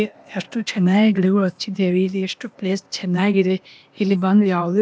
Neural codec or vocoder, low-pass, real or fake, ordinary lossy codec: codec, 16 kHz, 0.8 kbps, ZipCodec; none; fake; none